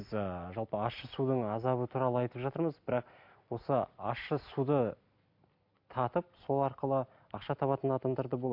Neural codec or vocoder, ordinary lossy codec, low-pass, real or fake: none; MP3, 48 kbps; 5.4 kHz; real